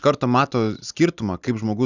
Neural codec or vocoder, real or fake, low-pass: none; real; 7.2 kHz